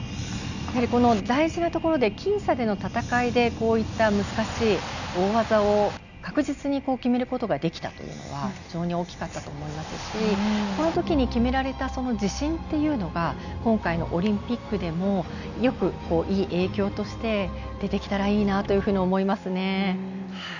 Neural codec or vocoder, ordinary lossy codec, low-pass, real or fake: none; none; 7.2 kHz; real